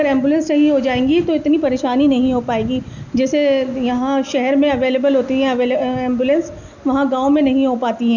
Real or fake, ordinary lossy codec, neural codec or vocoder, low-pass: real; none; none; 7.2 kHz